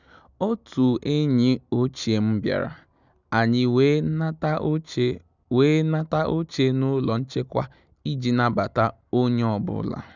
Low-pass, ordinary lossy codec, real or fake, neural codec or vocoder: 7.2 kHz; none; real; none